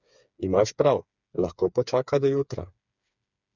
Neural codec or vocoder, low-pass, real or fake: codec, 16 kHz, 4 kbps, FreqCodec, smaller model; 7.2 kHz; fake